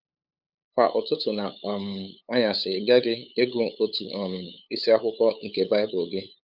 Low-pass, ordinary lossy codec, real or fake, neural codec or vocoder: 5.4 kHz; none; fake; codec, 16 kHz, 8 kbps, FunCodec, trained on LibriTTS, 25 frames a second